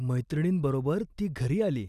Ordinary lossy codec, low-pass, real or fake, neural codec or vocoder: none; 14.4 kHz; fake; vocoder, 44.1 kHz, 128 mel bands every 256 samples, BigVGAN v2